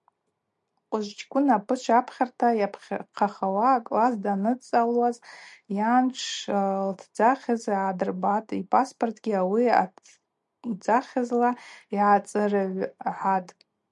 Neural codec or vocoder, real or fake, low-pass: none; real; 10.8 kHz